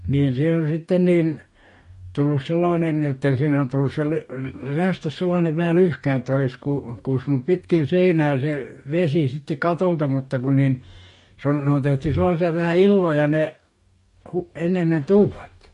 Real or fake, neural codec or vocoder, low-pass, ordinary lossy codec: fake; codec, 44.1 kHz, 2.6 kbps, DAC; 14.4 kHz; MP3, 48 kbps